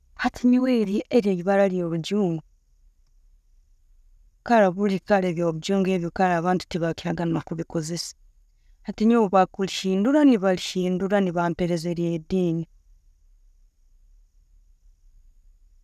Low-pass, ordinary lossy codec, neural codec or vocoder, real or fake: 14.4 kHz; none; none; real